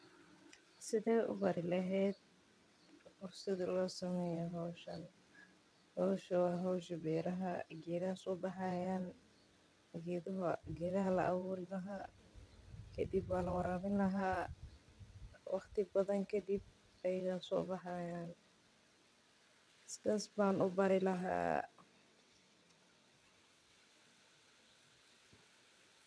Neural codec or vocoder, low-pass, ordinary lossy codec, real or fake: vocoder, 22.05 kHz, 80 mel bands, WaveNeXt; none; none; fake